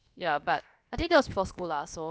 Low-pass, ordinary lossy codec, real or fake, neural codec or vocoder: none; none; fake; codec, 16 kHz, about 1 kbps, DyCAST, with the encoder's durations